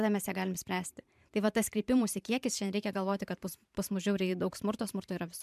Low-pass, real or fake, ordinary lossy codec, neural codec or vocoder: 14.4 kHz; fake; MP3, 96 kbps; vocoder, 44.1 kHz, 128 mel bands every 512 samples, BigVGAN v2